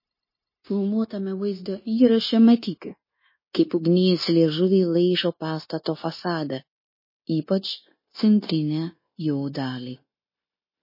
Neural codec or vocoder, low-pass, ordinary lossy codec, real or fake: codec, 16 kHz, 0.9 kbps, LongCat-Audio-Codec; 5.4 kHz; MP3, 24 kbps; fake